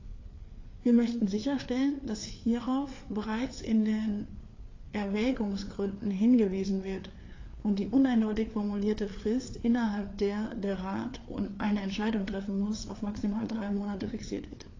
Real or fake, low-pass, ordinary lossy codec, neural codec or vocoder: fake; 7.2 kHz; AAC, 32 kbps; codec, 16 kHz, 4 kbps, FreqCodec, larger model